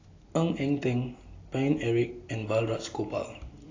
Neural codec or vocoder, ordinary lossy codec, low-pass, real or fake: none; AAC, 32 kbps; 7.2 kHz; real